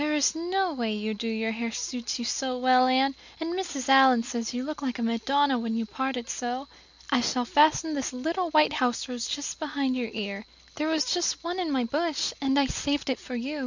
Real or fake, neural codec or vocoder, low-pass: real; none; 7.2 kHz